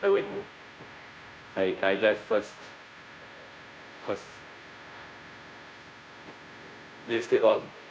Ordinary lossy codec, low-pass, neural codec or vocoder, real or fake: none; none; codec, 16 kHz, 0.5 kbps, FunCodec, trained on Chinese and English, 25 frames a second; fake